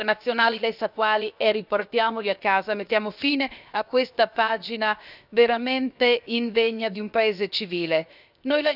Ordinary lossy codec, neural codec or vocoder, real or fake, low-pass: none; codec, 16 kHz, 0.7 kbps, FocalCodec; fake; 5.4 kHz